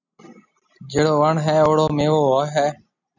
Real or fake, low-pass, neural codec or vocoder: real; 7.2 kHz; none